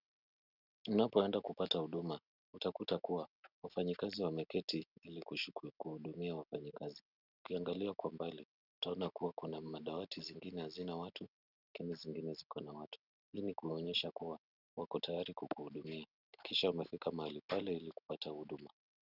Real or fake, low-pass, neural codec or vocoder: real; 5.4 kHz; none